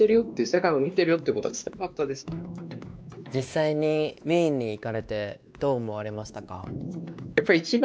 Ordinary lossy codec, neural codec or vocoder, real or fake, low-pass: none; codec, 16 kHz, 2 kbps, X-Codec, WavLM features, trained on Multilingual LibriSpeech; fake; none